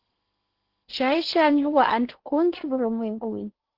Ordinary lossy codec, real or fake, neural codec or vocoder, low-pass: Opus, 16 kbps; fake; codec, 16 kHz in and 24 kHz out, 0.8 kbps, FocalCodec, streaming, 65536 codes; 5.4 kHz